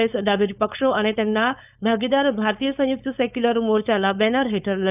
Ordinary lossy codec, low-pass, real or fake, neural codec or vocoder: none; 3.6 kHz; fake; codec, 16 kHz, 4.8 kbps, FACodec